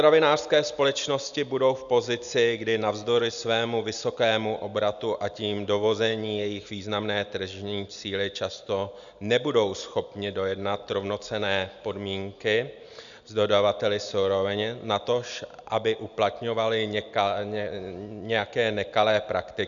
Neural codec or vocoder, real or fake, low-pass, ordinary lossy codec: none; real; 7.2 kHz; MP3, 96 kbps